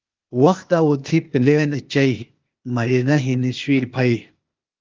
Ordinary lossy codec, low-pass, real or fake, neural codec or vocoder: Opus, 24 kbps; 7.2 kHz; fake; codec, 16 kHz, 0.8 kbps, ZipCodec